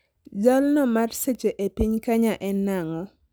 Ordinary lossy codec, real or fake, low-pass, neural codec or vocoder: none; real; none; none